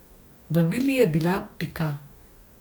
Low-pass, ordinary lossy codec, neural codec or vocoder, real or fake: none; none; codec, 44.1 kHz, 2.6 kbps, DAC; fake